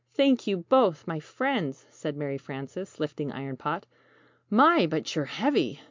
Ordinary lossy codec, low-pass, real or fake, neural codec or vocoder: MP3, 64 kbps; 7.2 kHz; real; none